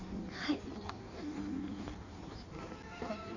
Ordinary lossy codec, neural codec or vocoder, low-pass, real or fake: none; codec, 16 kHz in and 24 kHz out, 1.1 kbps, FireRedTTS-2 codec; 7.2 kHz; fake